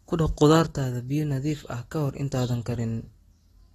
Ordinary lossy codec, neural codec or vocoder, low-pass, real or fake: AAC, 32 kbps; none; 19.8 kHz; real